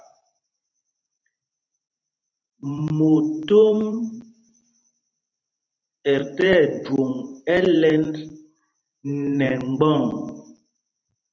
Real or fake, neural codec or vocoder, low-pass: fake; vocoder, 44.1 kHz, 128 mel bands every 512 samples, BigVGAN v2; 7.2 kHz